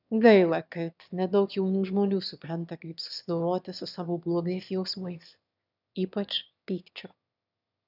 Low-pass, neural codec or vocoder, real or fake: 5.4 kHz; autoencoder, 22.05 kHz, a latent of 192 numbers a frame, VITS, trained on one speaker; fake